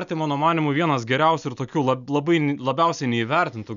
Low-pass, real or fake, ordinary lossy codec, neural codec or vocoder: 7.2 kHz; real; MP3, 96 kbps; none